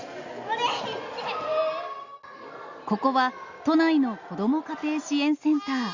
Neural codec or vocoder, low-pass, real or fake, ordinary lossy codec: none; 7.2 kHz; real; Opus, 64 kbps